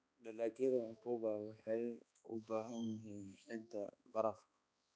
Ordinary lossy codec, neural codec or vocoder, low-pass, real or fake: none; codec, 16 kHz, 2 kbps, X-Codec, HuBERT features, trained on balanced general audio; none; fake